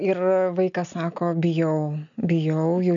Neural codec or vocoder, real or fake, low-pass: none; real; 7.2 kHz